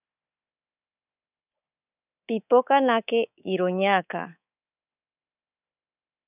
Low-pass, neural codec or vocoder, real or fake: 3.6 kHz; codec, 24 kHz, 3.1 kbps, DualCodec; fake